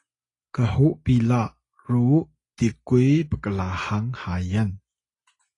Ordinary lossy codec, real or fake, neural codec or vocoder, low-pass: AAC, 32 kbps; real; none; 10.8 kHz